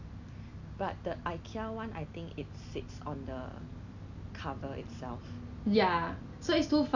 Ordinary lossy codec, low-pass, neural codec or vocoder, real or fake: none; 7.2 kHz; none; real